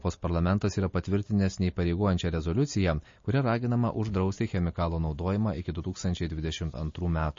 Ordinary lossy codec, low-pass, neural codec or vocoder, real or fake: MP3, 32 kbps; 7.2 kHz; none; real